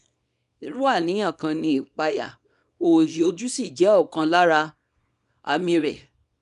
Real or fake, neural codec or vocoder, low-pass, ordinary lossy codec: fake; codec, 24 kHz, 0.9 kbps, WavTokenizer, small release; 10.8 kHz; none